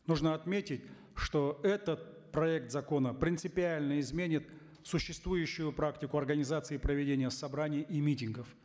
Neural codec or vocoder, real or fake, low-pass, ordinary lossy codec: none; real; none; none